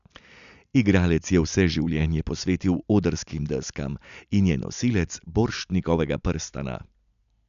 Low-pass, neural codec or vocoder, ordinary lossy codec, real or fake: 7.2 kHz; none; none; real